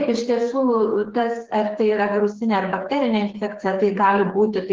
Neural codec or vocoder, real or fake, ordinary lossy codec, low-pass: codec, 16 kHz, 4 kbps, FreqCodec, smaller model; fake; Opus, 16 kbps; 7.2 kHz